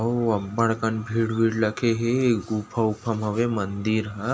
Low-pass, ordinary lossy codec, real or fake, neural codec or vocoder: none; none; real; none